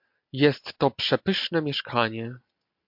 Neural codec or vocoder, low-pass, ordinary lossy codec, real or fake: none; 5.4 kHz; MP3, 48 kbps; real